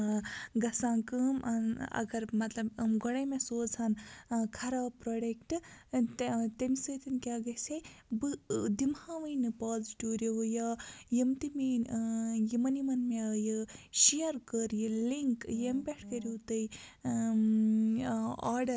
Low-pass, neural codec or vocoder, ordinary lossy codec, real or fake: none; none; none; real